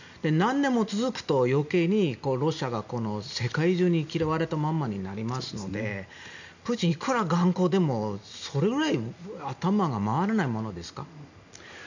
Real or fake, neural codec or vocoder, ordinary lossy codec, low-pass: real; none; none; 7.2 kHz